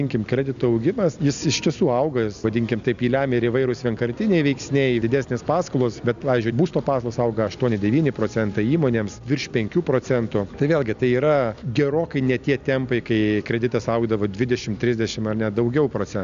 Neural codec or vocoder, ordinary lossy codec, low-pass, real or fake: none; AAC, 96 kbps; 7.2 kHz; real